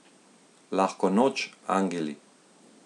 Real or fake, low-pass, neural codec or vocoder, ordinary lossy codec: real; 10.8 kHz; none; none